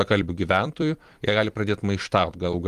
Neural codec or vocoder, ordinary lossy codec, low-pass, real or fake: vocoder, 44.1 kHz, 128 mel bands every 256 samples, BigVGAN v2; Opus, 24 kbps; 14.4 kHz; fake